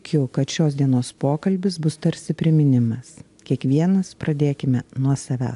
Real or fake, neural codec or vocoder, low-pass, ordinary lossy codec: real; none; 10.8 kHz; AAC, 64 kbps